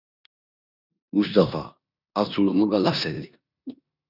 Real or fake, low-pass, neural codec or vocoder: fake; 5.4 kHz; codec, 16 kHz in and 24 kHz out, 0.9 kbps, LongCat-Audio-Codec, four codebook decoder